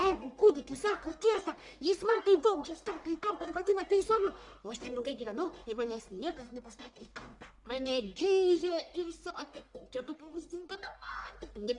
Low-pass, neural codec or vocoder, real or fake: 10.8 kHz; codec, 44.1 kHz, 1.7 kbps, Pupu-Codec; fake